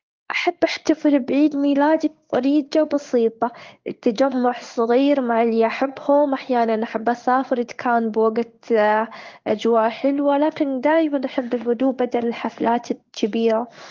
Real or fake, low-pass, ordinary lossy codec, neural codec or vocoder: fake; 7.2 kHz; Opus, 32 kbps; codec, 16 kHz in and 24 kHz out, 1 kbps, XY-Tokenizer